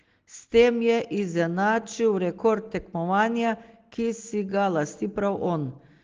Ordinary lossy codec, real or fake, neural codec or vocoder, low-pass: Opus, 16 kbps; real; none; 7.2 kHz